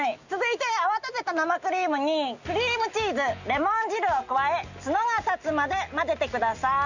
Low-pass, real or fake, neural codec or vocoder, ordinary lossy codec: 7.2 kHz; real; none; none